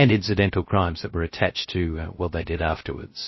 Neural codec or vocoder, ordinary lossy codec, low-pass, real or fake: codec, 16 kHz, 0.3 kbps, FocalCodec; MP3, 24 kbps; 7.2 kHz; fake